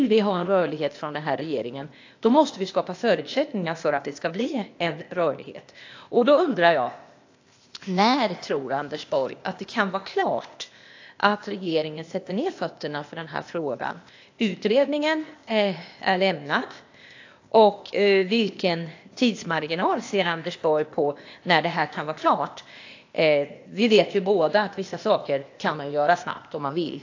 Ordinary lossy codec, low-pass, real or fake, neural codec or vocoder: AAC, 48 kbps; 7.2 kHz; fake; codec, 16 kHz, 0.8 kbps, ZipCodec